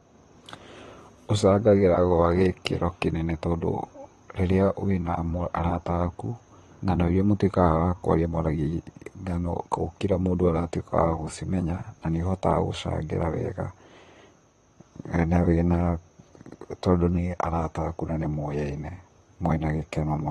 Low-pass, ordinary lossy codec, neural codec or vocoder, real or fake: 19.8 kHz; AAC, 32 kbps; vocoder, 44.1 kHz, 128 mel bands, Pupu-Vocoder; fake